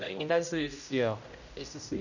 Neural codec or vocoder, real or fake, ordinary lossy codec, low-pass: codec, 16 kHz, 0.5 kbps, X-Codec, HuBERT features, trained on general audio; fake; none; 7.2 kHz